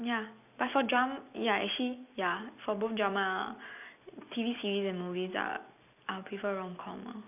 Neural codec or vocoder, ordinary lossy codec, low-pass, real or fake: none; none; 3.6 kHz; real